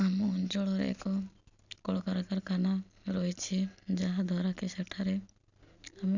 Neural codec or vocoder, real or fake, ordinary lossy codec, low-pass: none; real; none; 7.2 kHz